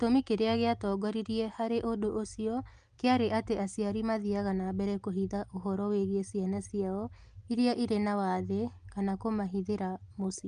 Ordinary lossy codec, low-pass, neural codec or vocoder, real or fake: Opus, 32 kbps; 9.9 kHz; none; real